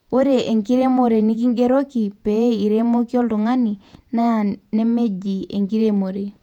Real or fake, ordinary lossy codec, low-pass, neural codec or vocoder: fake; none; 19.8 kHz; vocoder, 48 kHz, 128 mel bands, Vocos